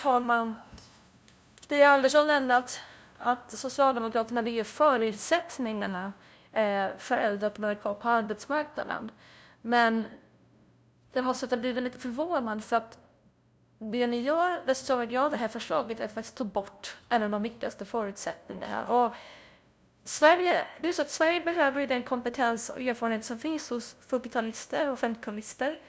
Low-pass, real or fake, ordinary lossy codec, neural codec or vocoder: none; fake; none; codec, 16 kHz, 0.5 kbps, FunCodec, trained on LibriTTS, 25 frames a second